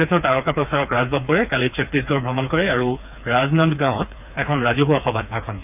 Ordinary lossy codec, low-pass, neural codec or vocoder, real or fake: none; 3.6 kHz; codec, 16 kHz, 4 kbps, FreqCodec, smaller model; fake